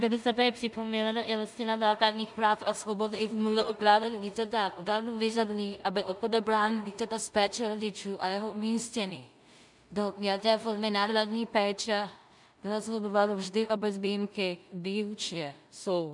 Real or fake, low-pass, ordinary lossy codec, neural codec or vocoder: fake; 10.8 kHz; MP3, 96 kbps; codec, 16 kHz in and 24 kHz out, 0.4 kbps, LongCat-Audio-Codec, two codebook decoder